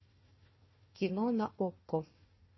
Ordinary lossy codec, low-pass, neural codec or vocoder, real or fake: MP3, 24 kbps; 7.2 kHz; codec, 16 kHz, 1 kbps, FunCodec, trained on Chinese and English, 50 frames a second; fake